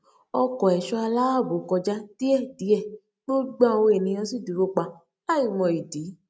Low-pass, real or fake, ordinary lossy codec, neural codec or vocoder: none; real; none; none